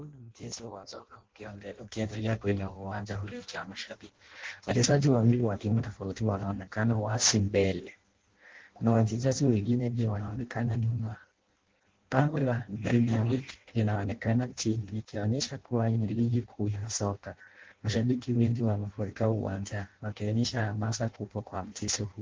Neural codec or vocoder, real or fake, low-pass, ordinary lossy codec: codec, 16 kHz in and 24 kHz out, 0.6 kbps, FireRedTTS-2 codec; fake; 7.2 kHz; Opus, 16 kbps